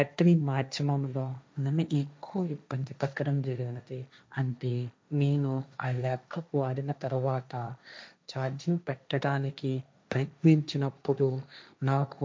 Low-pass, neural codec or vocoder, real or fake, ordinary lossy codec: none; codec, 16 kHz, 1.1 kbps, Voila-Tokenizer; fake; none